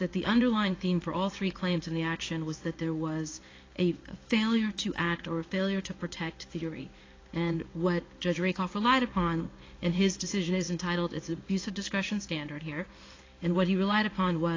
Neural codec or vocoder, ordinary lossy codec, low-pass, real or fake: codec, 16 kHz in and 24 kHz out, 1 kbps, XY-Tokenizer; AAC, 32 kbps; 7.2 kHz; fake